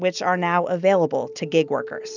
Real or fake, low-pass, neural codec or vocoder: real; 7.2 kHz; none